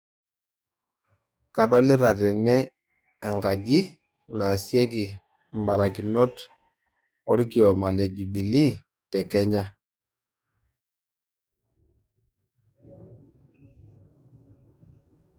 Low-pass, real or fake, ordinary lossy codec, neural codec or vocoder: none; fake; none; codec, 44.1 kHz, 2.6 kbps, DAC